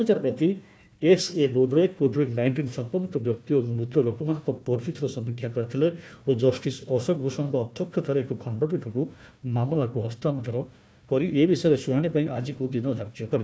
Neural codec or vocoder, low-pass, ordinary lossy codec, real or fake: codec, 16 kHz, 1 kbps, FunCodec, trained on Chinese and English, 50 frames a second; none; none; fake